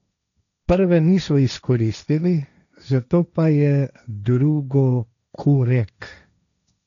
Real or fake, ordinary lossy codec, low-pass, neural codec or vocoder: fake; none; 7.2 kHz; codec, 16 kHz, 1.1 kbps, Voila-Tokenizer